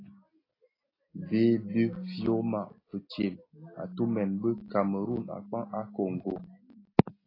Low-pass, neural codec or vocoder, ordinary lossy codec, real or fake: 5.4 kHz; none; AAC, 24 kbps; real